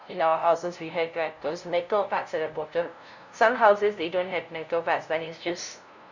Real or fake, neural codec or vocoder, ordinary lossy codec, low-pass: fake; codec, 16 kHz, 0.5 kbps, FunCodec, trained on LibriTTS, 25 frames a second; none; 7.2 kHz